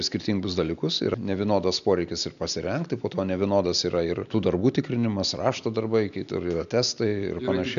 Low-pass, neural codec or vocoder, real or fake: 7.2 kHz; none; real